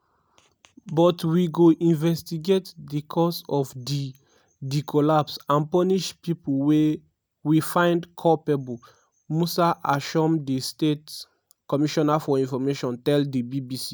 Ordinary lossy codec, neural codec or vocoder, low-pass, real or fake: none; none; none; real